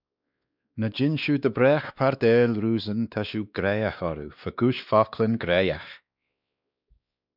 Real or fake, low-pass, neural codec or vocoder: fake; 5.4 kHz; codec, 16 kHz, 2 kbps, X-Codec, WavLM features, trained on Multilingual LibriSpeech